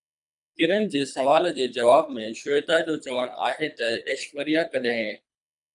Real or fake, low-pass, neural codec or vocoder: fake; 10.8 kHz; codec, 24 kHz, 3 kbps, HILCodec